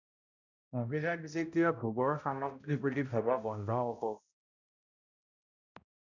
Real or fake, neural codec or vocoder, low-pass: fake; codec, 16 kHz, 0.5 kbps, X-Codec, HuBERT features, trained on balanced general audio; 7.2 kHz